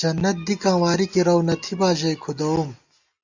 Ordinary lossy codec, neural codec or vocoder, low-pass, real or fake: Opus, 64 kbps; none; 7.2 kHz; real